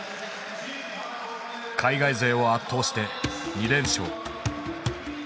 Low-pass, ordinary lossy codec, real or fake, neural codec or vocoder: none; none; real; none